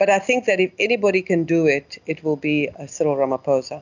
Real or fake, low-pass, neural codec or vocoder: real; 7.2 kHz; none